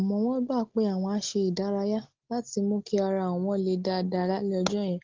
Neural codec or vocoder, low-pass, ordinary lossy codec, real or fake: none; 7.2 kHz; Opus, 16 kbps; real